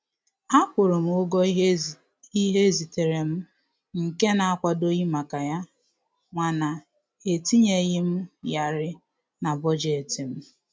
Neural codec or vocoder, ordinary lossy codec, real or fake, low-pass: none; none; real; none